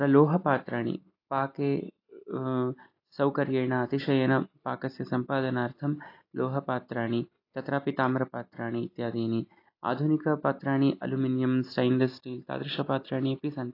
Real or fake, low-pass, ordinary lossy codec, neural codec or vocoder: real; 5.4 kHz; AAC, 32 kbps; none